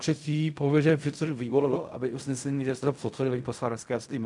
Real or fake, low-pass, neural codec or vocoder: fake; 10.8 kHz; codec, 16 kHz in and 24 kHz out, 0.4 kbps, LongCat-Audio-Codec, fine tuned four codebook decoder